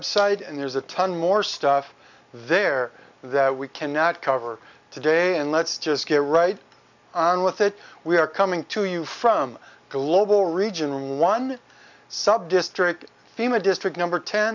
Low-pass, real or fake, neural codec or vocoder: 7.2 kHz; real; none